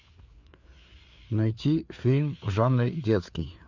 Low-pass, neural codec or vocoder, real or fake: 7.2 kHz; codec, 16 kHz, 4 kbps, FreqCodec, larger model; fake